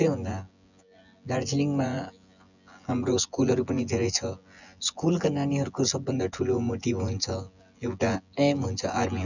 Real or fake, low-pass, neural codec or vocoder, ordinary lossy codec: fake; 7.2 kHz; vocoder, 24 kHz, 100 mel bands, Vocos; none